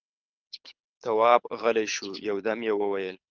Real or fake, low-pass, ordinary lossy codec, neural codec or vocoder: fake; 7.2 kHz; Opus, 32 kbps; codec, 24 kHz, 6 kbps, HILCodec